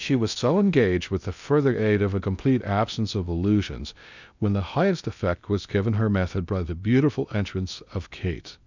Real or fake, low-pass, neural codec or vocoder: fake; 7.2 kHz; codec, 16 kHz in and 24 kHz out, 0.6 kbps, FocalCodec, streaming, 2048 codes